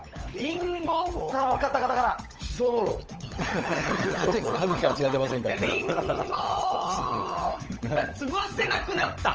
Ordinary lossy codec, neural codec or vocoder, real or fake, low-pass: Opus, 24 kbps; codec, 16 kHz, 16 kbps, FunCodec, trained on LibriTTS, 50 frames a second; fake; 7.2 kHz